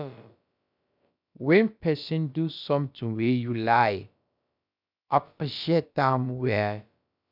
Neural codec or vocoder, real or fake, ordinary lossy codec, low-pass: codec, 16 kHz, about 1 kbps, DyCAST, with the encoder's durations; fake; none; 5.4 kHz